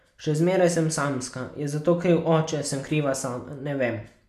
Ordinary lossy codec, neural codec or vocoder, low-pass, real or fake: none; none; 14.4 kHz; real